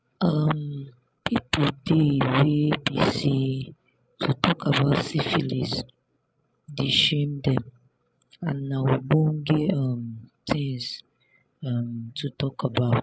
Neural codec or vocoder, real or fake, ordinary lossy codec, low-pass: codec, 16 kHz, 16 kbps, FreqCodec, larger model; fake; none; none